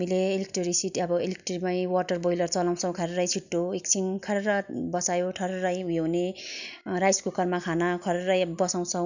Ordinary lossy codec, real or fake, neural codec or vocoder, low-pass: none; real; none; 7.2 kHz